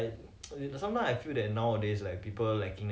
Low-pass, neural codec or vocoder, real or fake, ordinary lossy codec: none; none; real; none